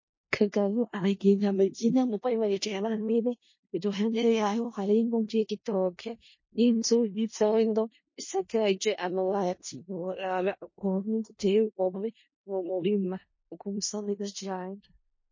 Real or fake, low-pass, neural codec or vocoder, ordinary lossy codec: fake; 7.2 kHz; codec, 16 kHz in and 24 kHz out, 0.4 kbps, LongCat-Audio-Codec, four codebook decoder; MP3, 32 kbps